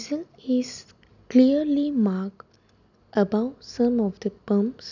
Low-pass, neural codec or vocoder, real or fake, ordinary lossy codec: 7.2 kHz; none; real; none